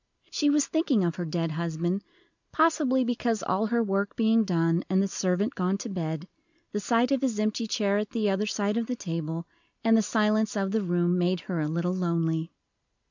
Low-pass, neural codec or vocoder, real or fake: 7.2 kHz; none; real